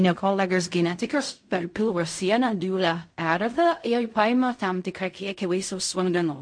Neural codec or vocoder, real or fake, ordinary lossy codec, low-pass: codec, 16 kHz in and 24 kHz out, 0.4 kbps, LongCat-Audio-Codec, fine tuned four codebook decoder; fake; MP3, 48 kbps; 9.9 kHz